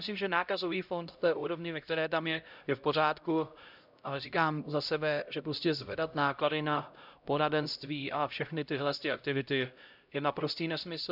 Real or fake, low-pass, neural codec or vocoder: fake; 5.4 kHz; codec, 16 kHz, 0.5 kbps, X-Codec, HuBERT features, trained on LibriSpeech